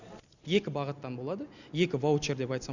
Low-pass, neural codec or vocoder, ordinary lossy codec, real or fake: 7.2 kHz; none; none; real